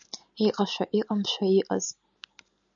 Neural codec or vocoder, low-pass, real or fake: none; 7.2 kHz; real